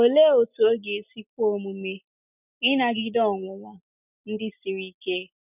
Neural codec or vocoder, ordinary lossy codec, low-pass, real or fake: none; none; 3.6 kHz; real